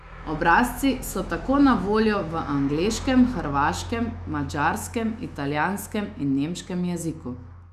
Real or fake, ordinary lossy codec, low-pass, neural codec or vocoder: fake; none; 14.4 kHz; autoencoder, 48 kHz, 128 numbers a frame, DAC-VAE, trained on Japanese speech